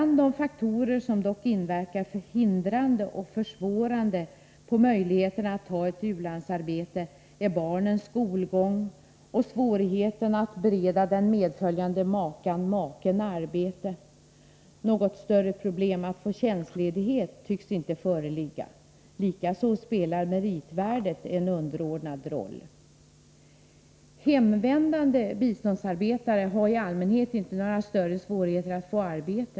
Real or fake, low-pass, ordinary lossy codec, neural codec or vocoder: real; none; none; none